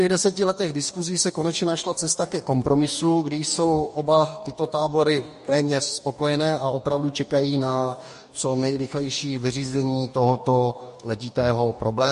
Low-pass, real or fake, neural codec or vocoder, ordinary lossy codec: 14.4 kHz; fake; codec, 44.1 kHz, 2.6 kbps, DAC; MP3, 48 kbps